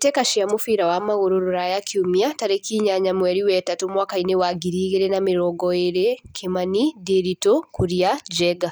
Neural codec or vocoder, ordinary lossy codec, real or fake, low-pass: none; none; real; none